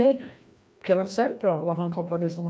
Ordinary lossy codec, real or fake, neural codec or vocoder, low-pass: none; fake; codec, 16 kHz, 1 kbps, FreqCodec, larger model; none